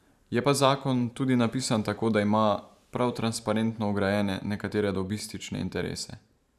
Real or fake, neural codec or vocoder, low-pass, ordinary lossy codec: fake; vocoder, 44.1 kHz, 128 mel bands every 512 samples, BigVGAN v2; 14.4 kHz; none